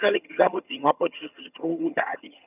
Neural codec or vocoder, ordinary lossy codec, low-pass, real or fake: vocoder, 22.05 kHz, 80 mel bands, HiFi-GAN; none; 3.6 kHz; fake